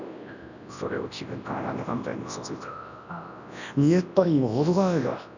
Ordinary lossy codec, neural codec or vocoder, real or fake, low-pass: none; codec, 24 kHz, 0.9 kbps, WavTokenizer, large speech release; fake; 7.2 kHz